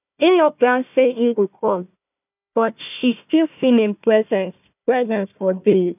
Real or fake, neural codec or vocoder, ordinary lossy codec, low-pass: fake; codec, 16 kHz, 1 kbps, FunCodec, trained on Chinese and English, 50 frames a second; none; 3.6 kHz